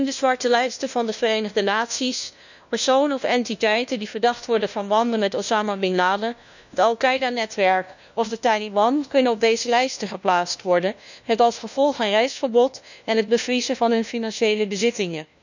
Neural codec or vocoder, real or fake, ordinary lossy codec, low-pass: codec, 16 kHz, 1 kbps, FunCodec, trained on LibriTTS, 50 frames a second; fake; none; 7.2 kHz